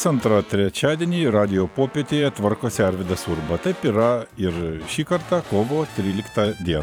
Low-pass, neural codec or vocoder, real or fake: 19.8 kHz; vocoder, 44.1 kHz, 128 mel bands every 512 samples, BigVGAN v2; fake